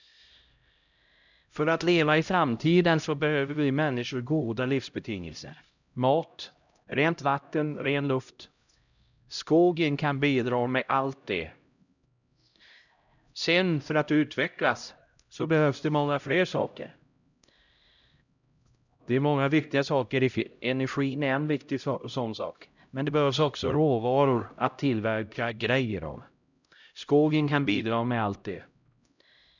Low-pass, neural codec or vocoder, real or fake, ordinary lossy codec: 7.2 kHz; codec, 16 kHz, 0.5 kbps, X-Codec, HuBERT features, trained on LibriSpeech; fake; none